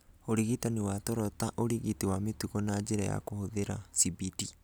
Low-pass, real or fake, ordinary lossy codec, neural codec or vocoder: none; real; none; none